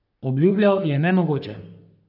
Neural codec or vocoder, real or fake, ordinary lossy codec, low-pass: codec, 44.1 kHz, 3.4 kbps, Pupu-Codec; fake; none; 5.4 kHz